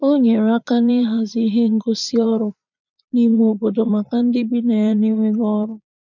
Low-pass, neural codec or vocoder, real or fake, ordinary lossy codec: 7.2 kHz; vocoder, 22.05 kHz, 80 mel bands, Vocos; fake; none